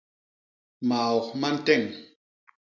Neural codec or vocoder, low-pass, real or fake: none; 7.2 kHz; real